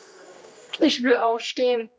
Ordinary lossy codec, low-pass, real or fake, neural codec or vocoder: none; none; fake; codec, 16 kHz, 1 kbps, X-Codec, HuBERT features, trained on general audio